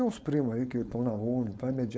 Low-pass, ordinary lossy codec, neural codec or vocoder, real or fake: none; none; codec, 16 kHz, 4.8 kbps, FACodec; fake